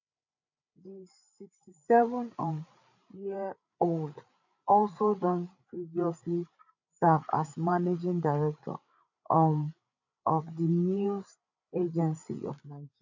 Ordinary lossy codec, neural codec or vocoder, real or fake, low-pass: none; codec, 16 kHz, 8 kbps, FreqCodec, larger model; fake; 7.2 kHz